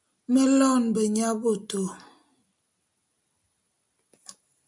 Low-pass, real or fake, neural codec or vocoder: 10.8 kHz; real; none